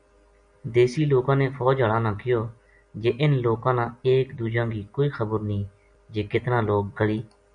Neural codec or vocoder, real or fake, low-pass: none; real; 9.9 kHz